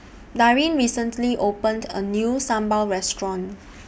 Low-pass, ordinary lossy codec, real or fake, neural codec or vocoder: none; none; real; none